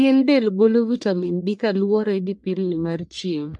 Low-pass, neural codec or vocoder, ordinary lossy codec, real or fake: 10.8 kHz; codec, 44.1 kHz, 1.7 kbps, Pupu-Codec; MP3, 64 kbps; fake